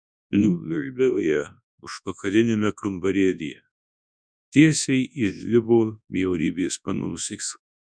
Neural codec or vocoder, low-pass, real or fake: codec, 24 kHz, 0.9 kbps, WavTokenizer, large speech release; 9.9 kHz; fake